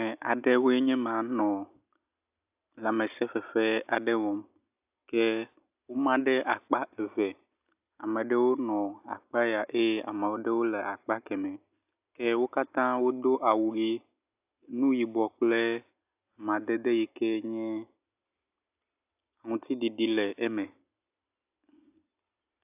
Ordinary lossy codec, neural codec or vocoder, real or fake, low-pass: AAC, 32 kbps; none; real; 3.6 kHz